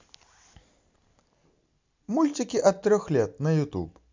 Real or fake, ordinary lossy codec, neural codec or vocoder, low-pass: real; MP3, 64 kbps; none; 7.2 kHz